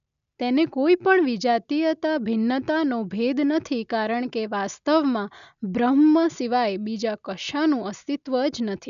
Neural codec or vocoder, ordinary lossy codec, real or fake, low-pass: none; none; real; 7.2 kHz